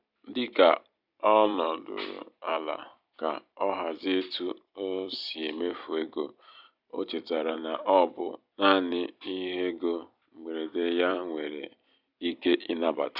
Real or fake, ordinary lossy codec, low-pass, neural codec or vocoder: fake; none; 5.4 kHz; vocoder, 44.1 kHz, 128 mel bands every 256 samples, BigVGAN v2